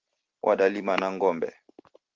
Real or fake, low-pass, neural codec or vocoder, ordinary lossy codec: real; 7.2 kHz; none; Opus, 16 kbps